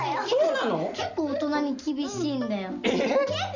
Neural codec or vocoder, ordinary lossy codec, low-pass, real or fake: none; none; 7.2 kHz; real